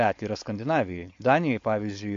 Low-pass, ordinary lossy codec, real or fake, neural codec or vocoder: 7.2 kHz; MP3, 48 kbps; fake; codec, 16 kHz, 4.8 kbps, FACodec